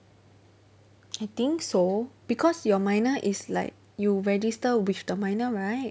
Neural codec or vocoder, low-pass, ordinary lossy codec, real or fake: none; none; none; real